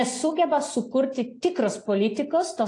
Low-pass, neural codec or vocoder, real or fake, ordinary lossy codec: 10.8 kHz; none; real; AAC, 48 kbps